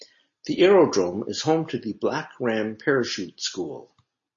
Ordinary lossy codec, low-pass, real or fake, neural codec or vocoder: MP3, 32 kbps; 7.2 kHz; real; none